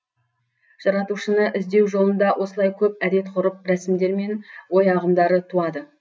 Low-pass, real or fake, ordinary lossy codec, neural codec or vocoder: none; real; none; none